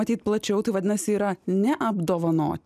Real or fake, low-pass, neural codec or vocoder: real; 14.4 kHz; none